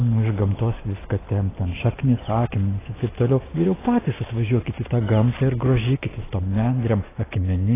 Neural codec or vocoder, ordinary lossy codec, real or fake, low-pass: none; AAC, 16 kbps; real; 3.6 kHz